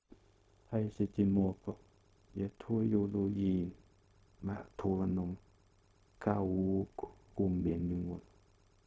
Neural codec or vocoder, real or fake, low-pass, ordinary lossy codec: codec, 16 kHz, 0.4 kbps, LongCat-Audio-Codec; fake; none; none